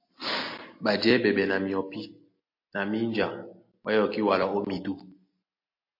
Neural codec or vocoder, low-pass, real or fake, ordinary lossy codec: none; 5.4 kHz; real; MP3, 32 kbps